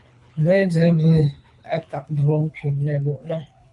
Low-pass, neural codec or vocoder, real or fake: 10.8 kHz; codec, 24 kHz, 3 kbps, HILCodec; fake